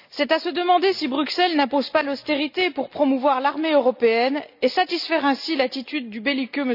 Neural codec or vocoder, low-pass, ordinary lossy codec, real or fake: none; 5.4 kHz; none; real